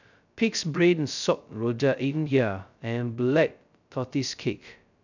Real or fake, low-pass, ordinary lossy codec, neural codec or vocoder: fake; 7.2 kHz; none; codec, 16 kHz, 0.2 kbps, FocalCodec